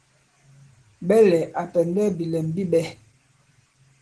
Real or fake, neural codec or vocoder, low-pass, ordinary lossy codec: real; none; 10.8 kHz; Opus, 16 kbps